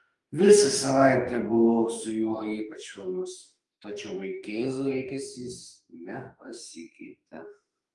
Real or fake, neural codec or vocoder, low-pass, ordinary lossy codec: fake; autoencoder, 48 kHz, 32 numbers a frame, DAC-VAE, trained on Japanese speech; 10.8 kHz; Opus, 32 kbps